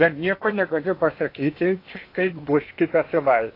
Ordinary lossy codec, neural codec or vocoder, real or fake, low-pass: AAC, 32 kbps; codec, 16 kHz in and 24 kHz out, 0.8 kbps, FocalCodec, streaming, 65536 codes; fake; 5.4 kHz